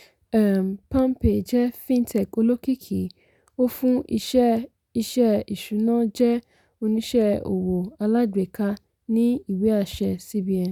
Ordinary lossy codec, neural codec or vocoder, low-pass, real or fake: none; none; 19.8 kHz; real